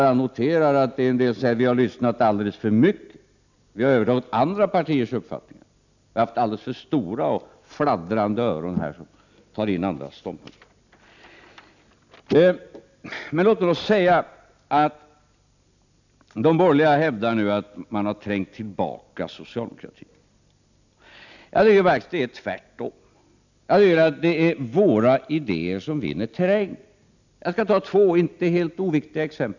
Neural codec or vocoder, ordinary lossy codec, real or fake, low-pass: none; none; real; 7.2 kHz